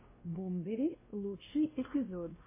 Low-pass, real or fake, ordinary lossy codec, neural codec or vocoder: 3.6 kHz; fake; MP3, 16 kbps; codec, 16 kHz, 1 kbps, X-Codec, WavLM features, trained on Multilingual LibriSpeech